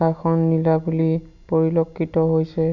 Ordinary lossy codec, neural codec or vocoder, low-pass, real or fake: none; none; 7.2 kHz; real